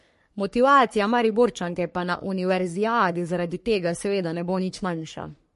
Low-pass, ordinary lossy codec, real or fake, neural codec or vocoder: 14.4 kHz; MP3, 48 kbps; fake; codec, 44.1 kHz, 3.4 kbps, Pupu-Codec